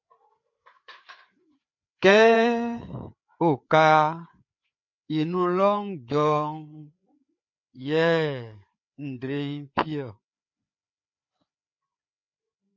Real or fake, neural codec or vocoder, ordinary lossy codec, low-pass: fake; codec, 16 kHz, 4 kbps, FreqCodec, larger model; MP3, 48 kbps; 7.2 kHz